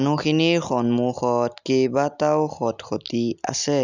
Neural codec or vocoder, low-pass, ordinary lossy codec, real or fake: none; 7.2 kHz; none; real